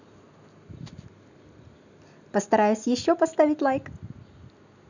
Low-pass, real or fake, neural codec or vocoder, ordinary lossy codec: 7.2 kHz; real; none; none